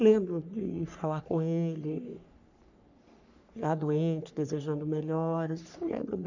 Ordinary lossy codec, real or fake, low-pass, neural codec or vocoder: none; fake; 7.2 kHz; codec, 44.1 kHz, 3.4 kbps, Pupu-Codec